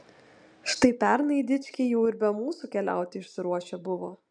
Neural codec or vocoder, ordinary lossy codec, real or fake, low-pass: none; MP3, 96 kbps; real; 9.9 kHz